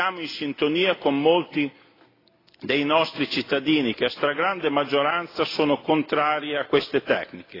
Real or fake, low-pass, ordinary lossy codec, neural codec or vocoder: real; 5.4 kHz; AAC, 24 kbps; none